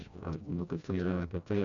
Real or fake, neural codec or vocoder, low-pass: fake; codec, 16 kHz, 1 kbps, FreqCodec, smaller model; 7.2 kHz